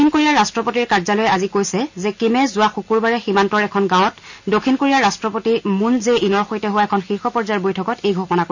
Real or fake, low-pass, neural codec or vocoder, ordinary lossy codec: real; 7.2 kHz; none; none